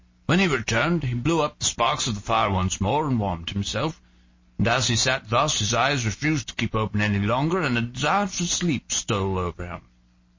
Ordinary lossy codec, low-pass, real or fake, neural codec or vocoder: MP3, 32 kbps; 7.2 kHz; real; none